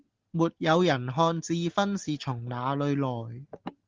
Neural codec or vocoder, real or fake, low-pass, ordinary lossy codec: none; real; 7.2 kHz; Opus, 16 kbps